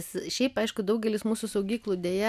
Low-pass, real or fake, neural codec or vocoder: 14.4 kHz; real; none